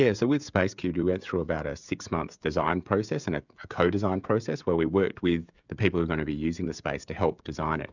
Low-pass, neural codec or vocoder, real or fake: 7.2 kHz; codec, 16 kHz, 16 kbps, FreqCodec, smaller model; fake